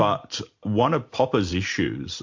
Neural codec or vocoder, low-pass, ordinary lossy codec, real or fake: none; 7.2 kHz; MP3, 48 kbps; real